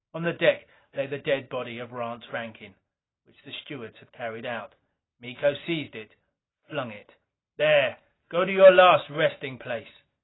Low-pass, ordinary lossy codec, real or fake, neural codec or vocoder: 7.2 kHz; AAC, 16 kbps; real; none